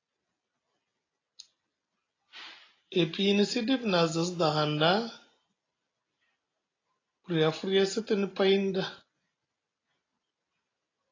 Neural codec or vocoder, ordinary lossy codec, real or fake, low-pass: none; AAC, 32 kbps; real; 7.2 kHz